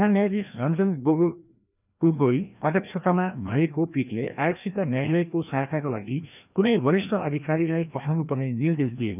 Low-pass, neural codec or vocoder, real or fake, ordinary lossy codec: 3.6 kHz; codec, 16 kHz, 1 kbps, FreqCodec, larger model; fake; none